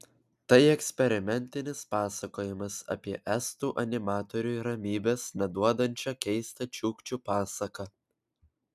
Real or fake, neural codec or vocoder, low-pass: real; none; 14.4 kHz